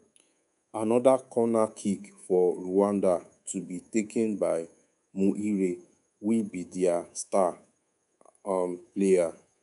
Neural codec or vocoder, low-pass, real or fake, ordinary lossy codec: codec, 24 kHz, 3.1 kbps, DualCodec; 10.8 kHz; fake; none